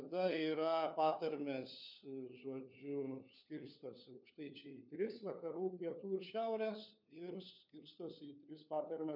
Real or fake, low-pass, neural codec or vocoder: fake; 5.4 kHz; codec, 16 kHz, 4 kbps, FunCodec, trained on LibriTTS, 50 frames a second